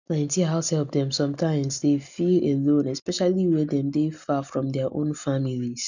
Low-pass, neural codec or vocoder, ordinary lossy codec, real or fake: 7.2 kHz; none; none; real